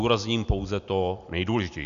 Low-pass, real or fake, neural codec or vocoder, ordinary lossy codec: 7.2 kHz; real; none; MP3, 96 kbps